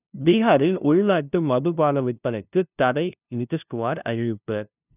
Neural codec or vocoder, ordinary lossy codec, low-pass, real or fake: codec, 16 kHz, 0.5 kbps, FunCodec, trained on LibriTTS, 25 frames a second; none; 3.6 kHz; fake